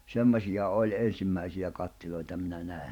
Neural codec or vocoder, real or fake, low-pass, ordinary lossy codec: vocoder, 44.1 kHz, 128 mel bands every 512 samples, BigVGAN v2; fake; 19.8 kHz; none